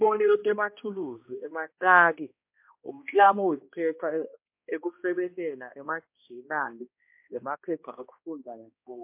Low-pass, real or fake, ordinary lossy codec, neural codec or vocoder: 3.6 kHz; fake; MP3, 32 kbps; codec, 16 kHz, 1 kbps, X-Codec, HuBERT features, trained on balanced general audio